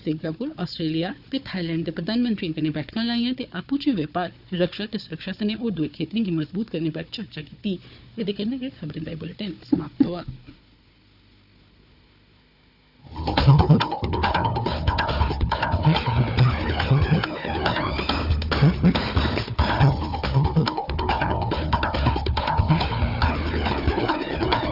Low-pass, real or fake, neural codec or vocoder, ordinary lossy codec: 5.4 kHz; fake; codec, 16 kHz, 4 kbps, FunCodec, trained on Chinese and English, 50 frames a second; none